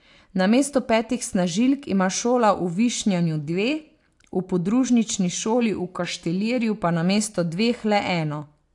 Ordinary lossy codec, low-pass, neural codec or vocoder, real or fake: AAC, 64 kbps; 10.8 kHz; none; real